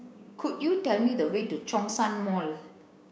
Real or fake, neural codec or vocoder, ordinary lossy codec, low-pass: fake; codec, 16 kHz, 6 kbps, DAC; none; none